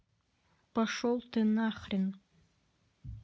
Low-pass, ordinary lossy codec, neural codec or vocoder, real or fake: none; none; none; real